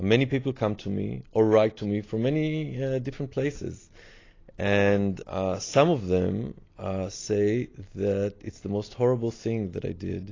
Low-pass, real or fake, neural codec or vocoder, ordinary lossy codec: 7.2 kHz; real; none; AAC, 32 kbps